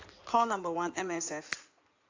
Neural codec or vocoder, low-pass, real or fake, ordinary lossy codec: codec, 16 kHz in and 24 kHz out, 2.2 kbps, FireRedTTS-2 codec; 7.2 kHz; fake; AAC, 48 kbps